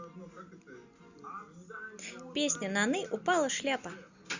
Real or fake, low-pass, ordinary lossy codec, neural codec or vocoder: real; 7.2 kHz; Opus, 64 kbps; none